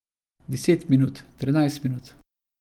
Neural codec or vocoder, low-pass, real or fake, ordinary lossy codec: none; 19.8 kHz; real; Opus, 32 kbps